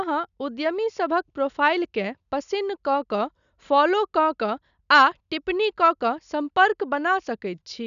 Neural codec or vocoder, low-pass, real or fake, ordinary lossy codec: none; 7.2 kHz; real; none